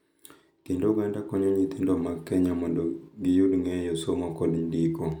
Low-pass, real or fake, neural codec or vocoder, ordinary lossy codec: 19.8 kHz; real; none; none